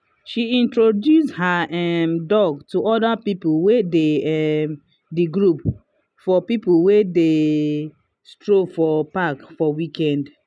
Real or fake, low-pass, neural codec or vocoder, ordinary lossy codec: real; none; none; none